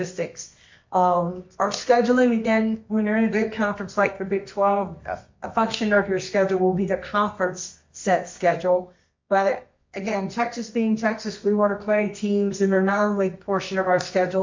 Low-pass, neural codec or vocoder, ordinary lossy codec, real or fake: 7.2 kHz; codec, 24 kHz, 0.9 kbps, WavTokenizer, medium music audio release; MP3, 48 kbps; fake